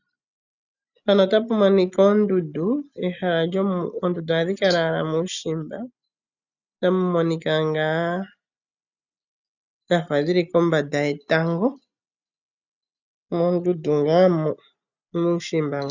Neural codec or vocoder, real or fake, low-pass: none; real; 7.2 kHz